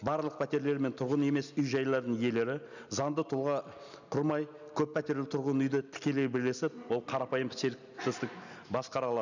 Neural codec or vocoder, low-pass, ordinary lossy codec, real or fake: none; 7.2 kHz; none; real